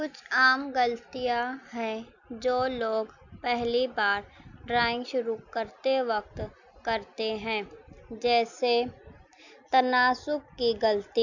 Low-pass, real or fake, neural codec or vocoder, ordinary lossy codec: 7.2 kHz; real; none; none